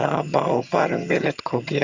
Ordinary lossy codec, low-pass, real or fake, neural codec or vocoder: Opus, 64 kbps; 7.2 kHz; fake; vocoder, 22.05 kHz, 80 mel bands, HiFi-GAN